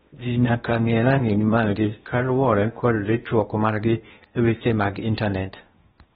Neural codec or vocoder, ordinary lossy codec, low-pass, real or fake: codec, 16 kHz in and 24 kHz out, 0.6 kbps, FocalCodec, streaming, 2048 codes; AAC, 16 kbps; 10.8 kHz; fake